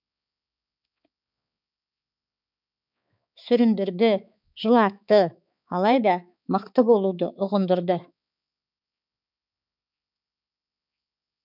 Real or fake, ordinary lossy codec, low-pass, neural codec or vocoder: fake; none; 5.4 kHz; codec, 16 kHz, 4 kbps, X-Codec, HuBERT features, trained on balanced general audio